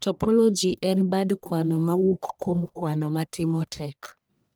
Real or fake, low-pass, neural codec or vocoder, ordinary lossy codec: fake; none; codec, 44.1 kHz, 1.7 kbps, Pupu-Codec; none